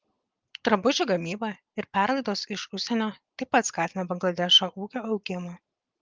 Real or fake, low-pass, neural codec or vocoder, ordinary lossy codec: real; 7.2 kHz; none; Opus, 32 kbps